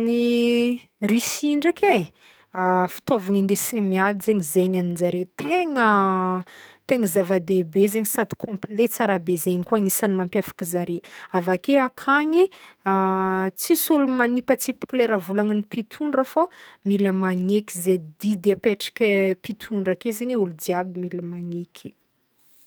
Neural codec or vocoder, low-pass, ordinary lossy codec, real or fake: codec, 44.1 kHz, 2.6 kbps, SNAC; none; none; fake